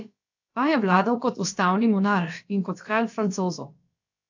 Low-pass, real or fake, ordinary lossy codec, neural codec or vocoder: 7.2 kHz; fake; AAC, 48 kbps; codec, 16 kHz, about 1 kbps, DyCAST, with the encoder's durations